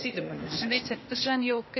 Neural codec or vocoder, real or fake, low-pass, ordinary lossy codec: codec, 16 kHz, 0.8 kbps, ZipCodec; fake; 7.2 kHz; MP3, 24 kbps